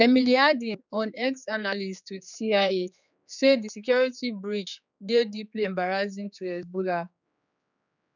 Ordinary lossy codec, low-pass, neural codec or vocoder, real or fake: none; 7.2 kHz; codec, 16 kHz, 4 kbps, X-Codec, HuBERT features, trained on general audio; fake